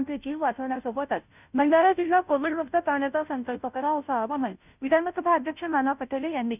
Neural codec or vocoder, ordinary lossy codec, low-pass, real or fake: codec, 16 kHz, 0.5 kbps, FunCodec, trained on Chinese and English, 25 frames a second; none; 3.6 kHz; fake